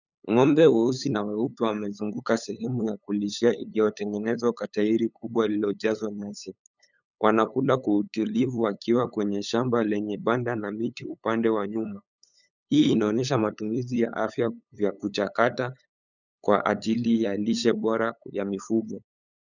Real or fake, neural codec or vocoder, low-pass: fake; codec, 16 kHz, 8 kbps, FunCodec, trained on LibriTTS, 25 frames a second; 7.2 kHz